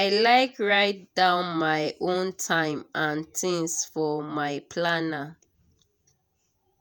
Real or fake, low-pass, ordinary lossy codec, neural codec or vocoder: fake; none; none; vocoder, 48 kHz, 128 mel bands, Vocos